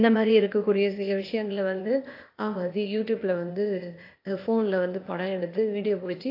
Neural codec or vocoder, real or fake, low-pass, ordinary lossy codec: codec, 16 kHz, 0.8 kbps, ZipCodec; fake; 5.4 kHz; AAC, 32 kbps